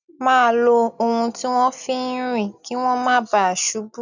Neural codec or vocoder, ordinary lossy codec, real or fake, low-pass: none; none; real; 7.2 kHz